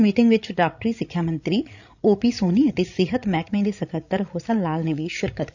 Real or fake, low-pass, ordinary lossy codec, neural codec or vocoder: fake; 7.2 kHz; none; codec, 16 kHz, 16 kbps, FreqCodec, larger model